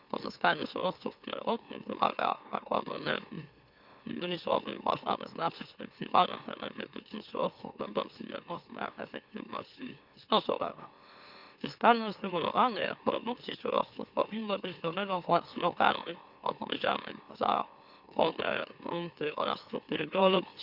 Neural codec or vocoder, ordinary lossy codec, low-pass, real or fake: autoencoder, 44.1 kHz, a latent of 192 numbers a frame, MeloTTS; Opus, 64 kbps; 5.4 kHz; fake